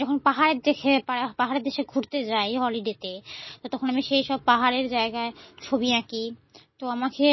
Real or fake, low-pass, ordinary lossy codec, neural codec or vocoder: real; 7.2 kHz; MP3, 24 kbps; none